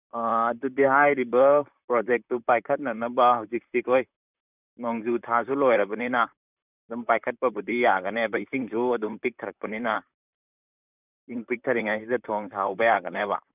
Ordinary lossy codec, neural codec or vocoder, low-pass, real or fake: none; vocoder, 44.1 kHz, 128 mel bands, Pupu-Vocoder; 3.6 kHz; fake